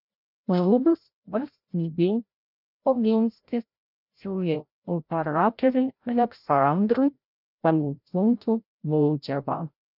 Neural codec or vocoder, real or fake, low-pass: codec, 16 kHz, 0.5 kbps, FreqCodec, larger model; fake; 5.4 kHz